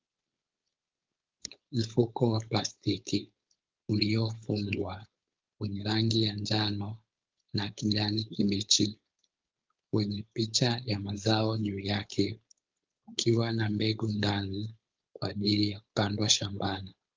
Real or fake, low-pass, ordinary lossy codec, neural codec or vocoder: fake; 7.2 kHz; Opus, 32 kbps; codec, 16 kHz, 4.8 kbps, FACodec